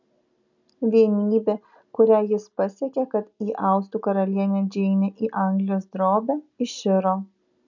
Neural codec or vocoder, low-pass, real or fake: none; 7.2 kHz; real